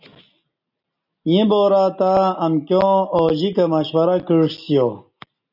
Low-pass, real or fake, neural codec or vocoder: 5.4 kHz; real; none